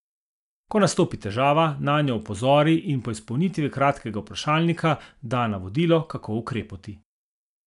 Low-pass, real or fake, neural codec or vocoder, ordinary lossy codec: 10.8 kHz; real; none; none